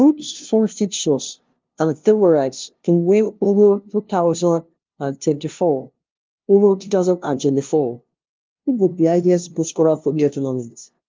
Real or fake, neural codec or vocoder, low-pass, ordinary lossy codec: fake; codec, 16 kHz, 0.5 kbps, FunCodec, trained on LibriTTS, 25 frames a second; 7.2 kHz; Opus, 24 kbps